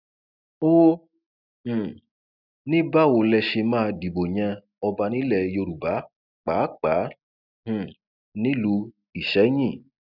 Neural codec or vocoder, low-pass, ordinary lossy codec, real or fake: none; 5.4 kHz; none; real